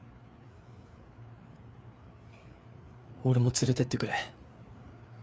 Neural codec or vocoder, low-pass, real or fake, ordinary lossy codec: codec, 16 kHz, 4 kbps, FreqCodec, larger model; none; fake; none